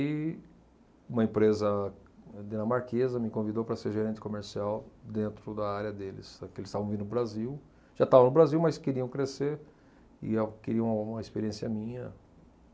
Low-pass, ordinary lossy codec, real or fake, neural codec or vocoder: none; none; real; none